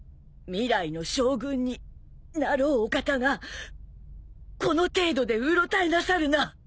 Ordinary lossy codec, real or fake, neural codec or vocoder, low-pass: none; real; none; none